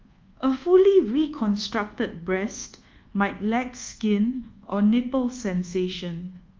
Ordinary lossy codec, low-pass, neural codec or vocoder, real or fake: Opus, 32 kbps; 7.2 kHz; codec, 24 kHz, 1.2 kbps, DualCodec; fake